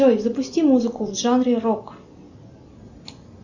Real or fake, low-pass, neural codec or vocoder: real; 7.2 kHz; none